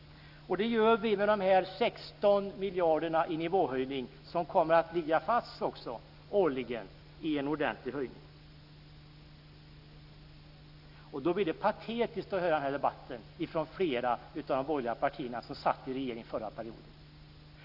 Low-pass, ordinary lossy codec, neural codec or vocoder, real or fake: 5.4 kHz; none; none; real